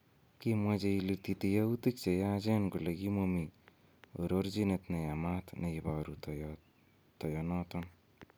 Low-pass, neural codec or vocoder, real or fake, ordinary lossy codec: none; none; real; none